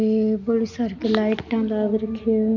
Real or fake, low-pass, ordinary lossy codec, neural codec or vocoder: real; 7.2 kHz; none; none